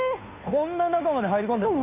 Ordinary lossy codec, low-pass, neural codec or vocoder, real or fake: none; 3.6 kHz; codec, 16 kHz, 2 kbps, FunCodec, trained on Chinese and English, 25 frames a second; fake